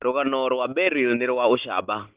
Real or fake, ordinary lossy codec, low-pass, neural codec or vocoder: real; Opus, 16 kbps; 3.6 kHz; none